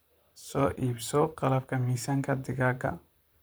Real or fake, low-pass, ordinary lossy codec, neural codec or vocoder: fake; none; none; vocoder, 44.1 kHz, 128 mel bands, Pupu-Vocoder